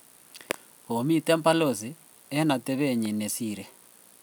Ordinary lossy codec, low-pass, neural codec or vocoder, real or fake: none; none; none; real